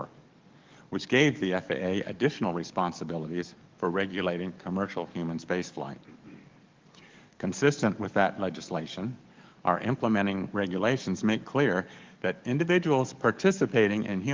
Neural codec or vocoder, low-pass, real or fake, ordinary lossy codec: codec, 44.1 kHz, 7.8 kbps, DAC; 7.2 kHz; fake; Opus, 32 kbps